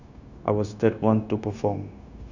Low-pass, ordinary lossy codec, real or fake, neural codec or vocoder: 7.2 kHz; none; fake; codec, 16 kHz, 0.9 kbps, LongCat-Audio-Codec